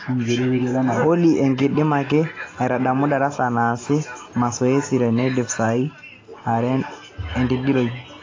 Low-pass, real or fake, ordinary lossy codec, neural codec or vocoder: 7.2 kHz; real; AAC, 32 kbps; none